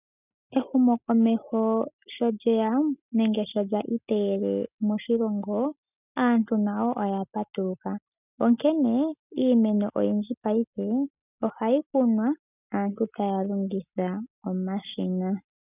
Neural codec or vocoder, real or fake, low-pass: none; real; 3.6 kHz